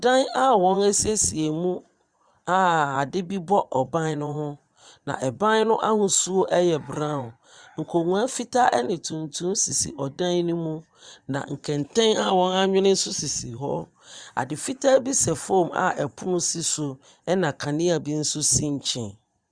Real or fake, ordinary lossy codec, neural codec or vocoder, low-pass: fake; Opus, 64 kbps; vocoder, 22.05 kHz, 80 mel bands, Vocos; 9.9 kHz